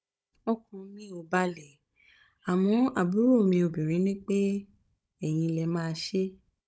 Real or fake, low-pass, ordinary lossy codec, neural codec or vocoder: fake; none; none; codec, 16 kHz, 16 kbps, FunCodec, trained on Chinese and English, 50 frames a second